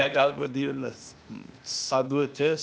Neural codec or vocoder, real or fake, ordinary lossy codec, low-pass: codec, 16 kHz, 0.8 kbps, ZipCodec; fake; none; none